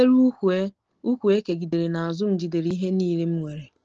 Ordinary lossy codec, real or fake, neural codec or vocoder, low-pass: Opus, 16 kbps; real; none; 7.2 kHz